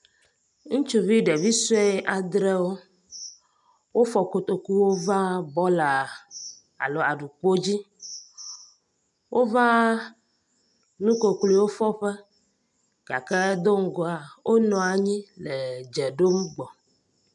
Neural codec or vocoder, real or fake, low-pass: none; real; 10.8 kHz